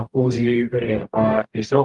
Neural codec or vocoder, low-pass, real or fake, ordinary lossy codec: codec, 44.1 kHz, 0.9 kbps, DAC; 10.8 kHz; fake; Opus, 32 kbps